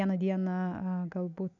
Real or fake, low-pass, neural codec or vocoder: real; 7.2 kHz; none